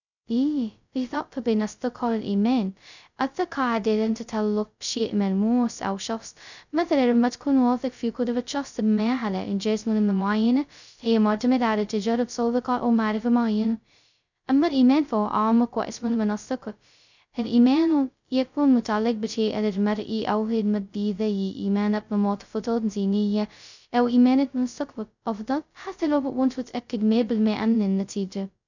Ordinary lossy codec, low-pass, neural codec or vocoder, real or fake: none; 7.2 kHz; codec, 16 kHz, 0.2 kbps, FocalCodec; fake